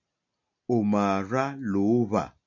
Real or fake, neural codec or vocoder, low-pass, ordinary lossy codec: real; none; 7.2 kHz; MP3, 64 kbps